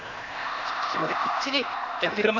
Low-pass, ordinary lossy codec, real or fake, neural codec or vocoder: 7.2 kHz; none; fake; codec, 16 kHz, 0.8 kbps, ZipCodec